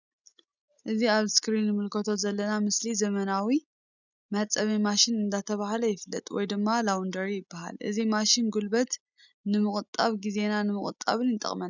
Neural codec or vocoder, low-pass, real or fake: none; 7.2 kHz; real